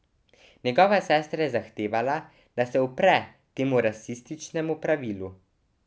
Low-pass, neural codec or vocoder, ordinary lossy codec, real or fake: none; none; none; real